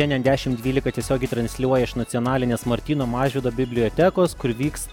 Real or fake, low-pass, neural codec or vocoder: real; 19.8 kHz; none